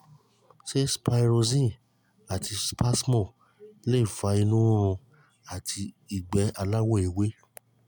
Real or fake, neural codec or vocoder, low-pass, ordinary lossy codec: real; none; none; none